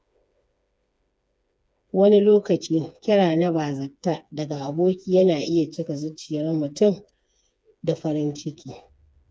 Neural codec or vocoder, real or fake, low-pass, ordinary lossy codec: codec, 16 kHz, 4 kbps, FreqCodec, smaller model; fake; none; none